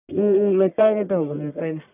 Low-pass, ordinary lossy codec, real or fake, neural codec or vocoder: 3.6 kHz; none; fake; codec, 44.1 kHz, 1.7 kbps, Pupu-Codec